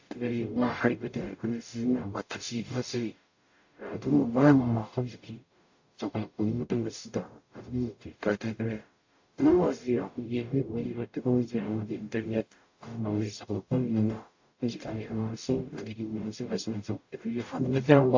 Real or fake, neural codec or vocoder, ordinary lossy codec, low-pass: fake; codec, 44.1 kHz, 0.9 kbps, DAC; AAC, 48 kbps; 7.2 kHz